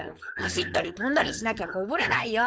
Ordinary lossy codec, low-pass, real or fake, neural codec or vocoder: none; none; fake; codec, 16 kHz, 4.8 kbps, FACodec